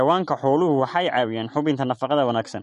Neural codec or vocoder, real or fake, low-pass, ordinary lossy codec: none; real; 14.4 kHz; MP3, 48 kbps